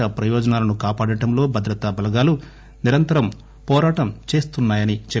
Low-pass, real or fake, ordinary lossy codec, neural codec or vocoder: none; real; none; none